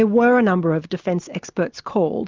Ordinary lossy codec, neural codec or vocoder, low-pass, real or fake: Opus, 24 kbps; none; 7.2 kHz; real